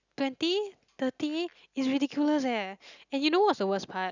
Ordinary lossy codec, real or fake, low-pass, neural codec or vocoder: none; real; 7.2 kHz; none